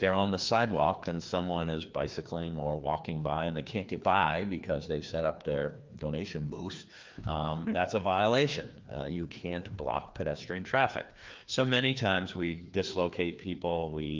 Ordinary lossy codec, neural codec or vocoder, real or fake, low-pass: Opus, 32 kbps; codec, 16 kHz, 2 kbps, FreqCodec, larger model; fake; 7.2 kHz